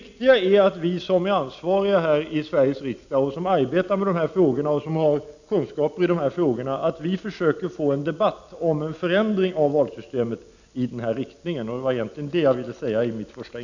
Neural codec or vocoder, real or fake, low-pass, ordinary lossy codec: none; real; 7.2 kHz; none